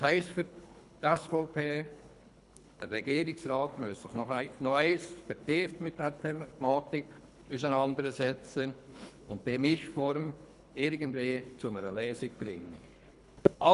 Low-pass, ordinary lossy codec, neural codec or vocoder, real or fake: 10.8 kHz; none; codec, 24 kHz, 3 kbps, HILCodec; fake